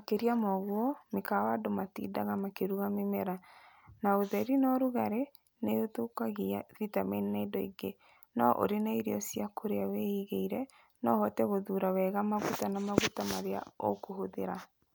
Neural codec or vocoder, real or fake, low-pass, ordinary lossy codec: none; real; none; none